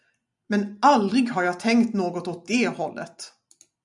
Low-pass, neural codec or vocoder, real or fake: 10.8 kHz; none; real